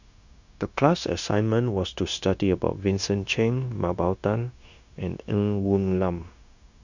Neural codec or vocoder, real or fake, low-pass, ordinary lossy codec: codec, 16 kHz, 0.9 kbps, LongCat-Audio-Codec; fake; 7.2 kHz; none